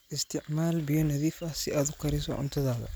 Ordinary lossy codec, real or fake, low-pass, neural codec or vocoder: none; real; none; none